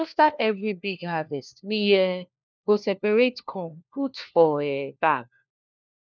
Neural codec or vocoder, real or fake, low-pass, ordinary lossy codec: codec, 16 kHz, 1 kbps, FunCodec, trained on LibriTTS, 50 frames a second; fake; none; none